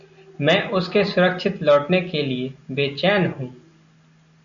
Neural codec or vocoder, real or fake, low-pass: none; real; 7.2 kHz